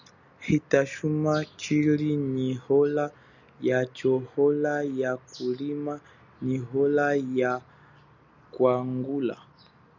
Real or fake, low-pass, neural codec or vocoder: real; 7.2 kHz; none